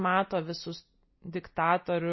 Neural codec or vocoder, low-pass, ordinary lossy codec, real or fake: none; 7.2 kHz; MP3, 24 kbps; real